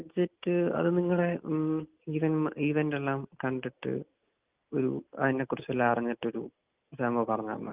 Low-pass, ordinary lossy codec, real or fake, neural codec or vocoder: 3.6 kHz; Opus, 32 kbps; real; none